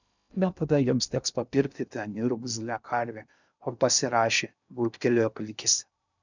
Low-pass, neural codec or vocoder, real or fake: 7.2 kHz; codec, 16 kHz in and 24 kHz out, 0.6 kbps, FocalCodec, streaming, 2048 codes; fake